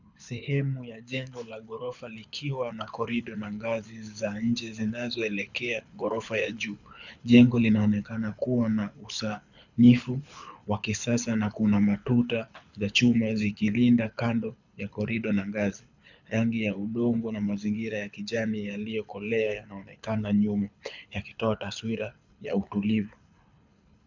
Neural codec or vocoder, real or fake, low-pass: codec, 24 kHz, 6 kbps, HILCodec; fake; 7.2 kHz